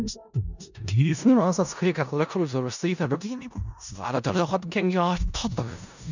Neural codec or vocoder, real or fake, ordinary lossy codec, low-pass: codec, 16 kHz in and 24 kHz out, 0.4 kbps, LongCat-Audio-Codec, four codebook decoder; fake; none; 7.2 kHz